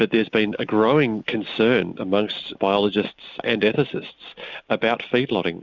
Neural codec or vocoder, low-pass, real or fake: none; 7.2 kHz; real